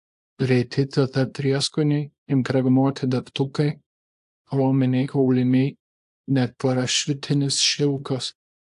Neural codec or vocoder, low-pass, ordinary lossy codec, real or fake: codec, 24 kHz, 0.9 kbps, WavTokenizer, small release; 10.8 kHz; AAC, 64 kbps; fake